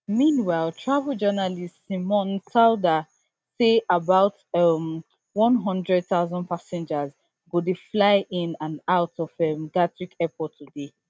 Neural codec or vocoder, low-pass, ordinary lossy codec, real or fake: none; none; none; real